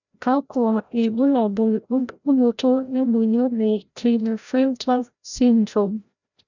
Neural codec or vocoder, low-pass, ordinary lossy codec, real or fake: codec, 16 kHz, 0.5 kbps, FreqCodec, larger model; 7.2 kHz; none; fake